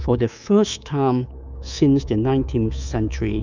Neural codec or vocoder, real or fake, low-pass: codec, 24 kHz, 3.1 kbps, DualCodec; fake; 7.2 kHz